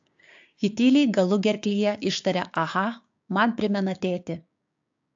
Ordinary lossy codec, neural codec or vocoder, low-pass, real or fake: AAC, 64 kbps; codec, 16 kHz, 6 kbps, DAC; 7.2 kHz; fake